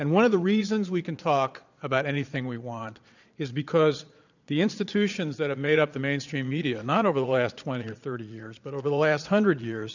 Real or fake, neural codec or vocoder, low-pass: fake; vocoder, 22.05 kHz, 80 mel bands, Vocos; 7.2 kHz